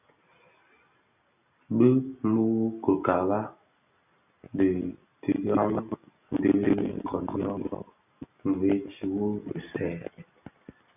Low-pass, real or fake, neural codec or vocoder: 3.6 kHz; real; none